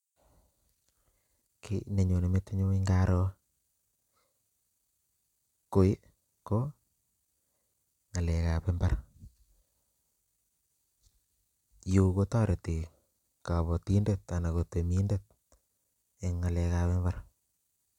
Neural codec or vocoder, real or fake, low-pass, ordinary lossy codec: none; real; 19.8 kHz; none